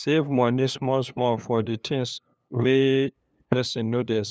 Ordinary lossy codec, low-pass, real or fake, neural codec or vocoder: none; none; fake; codec, 16 kHz, 2 kbps, FunCodec, trained on LibriTTS, 25 frames a second